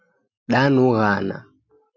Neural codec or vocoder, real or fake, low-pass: none; real; 7.2 kHz